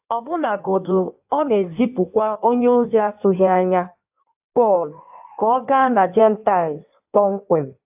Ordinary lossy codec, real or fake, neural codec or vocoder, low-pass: AAC, 32 kbps; fake; codec, 16 kHz in and 24 kHz out, 1.1 kbps, FireRedTTS-2 codec; 3.6 kHz